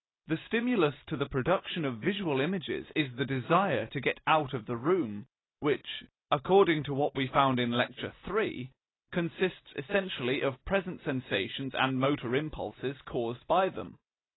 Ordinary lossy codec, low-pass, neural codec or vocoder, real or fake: AAC, 16 kbps; 7.2 kHz; none; real